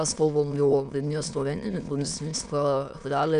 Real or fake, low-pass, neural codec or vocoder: fake; 9.9 kHz; autoencoder, 22.05 kHz, a latent of 192 numbers a frame, VITS, trained on many speakers